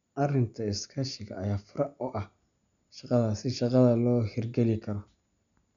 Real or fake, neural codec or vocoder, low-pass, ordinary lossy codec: real; none; 7.2 kHz; none